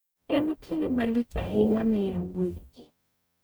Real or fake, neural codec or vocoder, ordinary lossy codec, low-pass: fake; codec, 44.1 kHz, 0.9 kbps, DAC; none; none